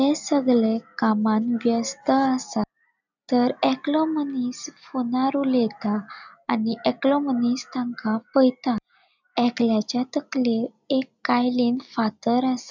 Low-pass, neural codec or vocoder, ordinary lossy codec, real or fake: 7.2 kHz; none; none; real